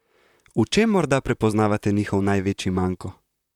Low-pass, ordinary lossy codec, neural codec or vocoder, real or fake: 19.8 kHz; none; vocoder, 44.1 kHz, 128 mel bands, Pupu-Vocoder; fake